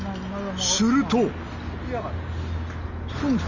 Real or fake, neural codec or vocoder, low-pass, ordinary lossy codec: real; none; 7.2 kHz; none